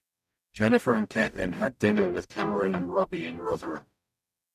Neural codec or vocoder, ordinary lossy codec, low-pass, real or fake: codec, 44.1 kHz, 0.9 kbps, DAC; none; 14.4 kHz; fake